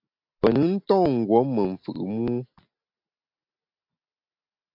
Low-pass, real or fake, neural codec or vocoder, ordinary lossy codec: 5.4 kHz; real; none; MP3, 48 kbps